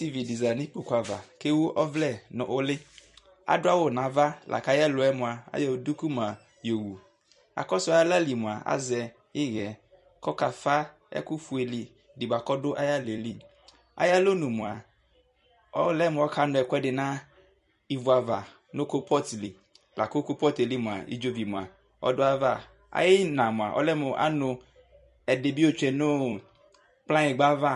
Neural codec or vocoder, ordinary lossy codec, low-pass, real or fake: vocoder, 44.1 kHz, 128 mel bands every 256 samples, BigVGAN v2; MP3, 48 kbps; 14.4 kHz; fake